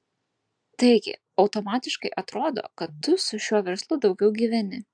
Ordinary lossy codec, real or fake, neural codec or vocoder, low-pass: AAC, 64 kbps; real; none; 9.9 kHz